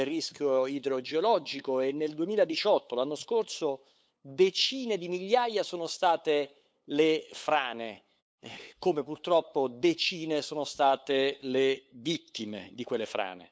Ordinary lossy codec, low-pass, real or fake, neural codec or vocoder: none; none; fake; codec, 16 kHz, 8 kbps, FunCodec, trained on LibriTTS, 25 frames a second